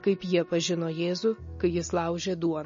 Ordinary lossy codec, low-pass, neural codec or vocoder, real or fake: MP3, 32 kbps; 7.2 kHz; none; real